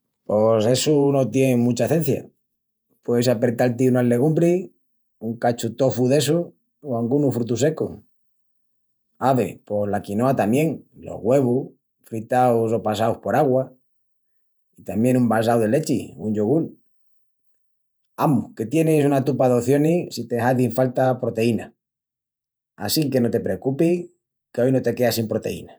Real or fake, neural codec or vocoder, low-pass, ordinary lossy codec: fake; vocoder, 48 kHz, 128 mel bands, Vocos; none; none